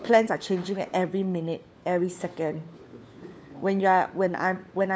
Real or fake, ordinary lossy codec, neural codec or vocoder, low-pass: fake; none; codec, 16 kHz, 4 kbps, FunCodec, trained on LibriTTS, 50 frames a second; none